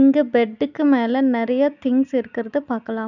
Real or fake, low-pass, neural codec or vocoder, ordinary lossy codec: real; 7.2 kHz; none; none